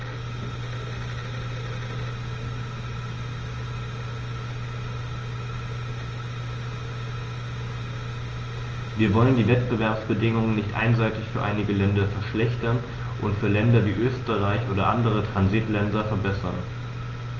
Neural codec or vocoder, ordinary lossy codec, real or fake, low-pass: none; Opus, 24 kbps; real; 7.2 kHz